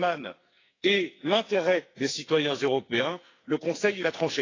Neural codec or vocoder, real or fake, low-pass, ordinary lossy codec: codec, 44.1 kHz, 2.6 kbps, SNAC; fake; 7.2 kHz; AAC, 32 kbps